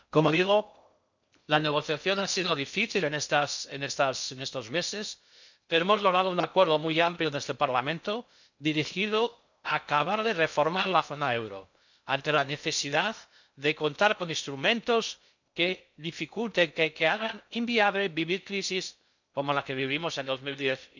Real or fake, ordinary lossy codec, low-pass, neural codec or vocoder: fake; none; 7.2 kHz; codec, 16 kHz in and 24 kHz out, 0.6 kbps, FocalCodec, streaming, 2048 codes